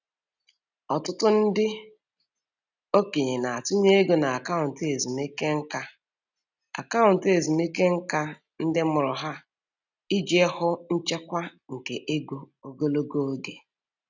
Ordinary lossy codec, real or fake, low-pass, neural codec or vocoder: none; real; 7.2 kHz; none